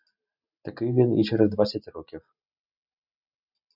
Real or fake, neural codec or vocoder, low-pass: real; none; 5.4 kHz